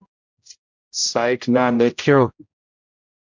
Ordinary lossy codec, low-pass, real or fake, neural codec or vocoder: MP3, 64 kbps; 7.2 kHz; fake; codec, 16 kHz, 0.5 kbps, X-Codec, HuBERT features, trained on general audio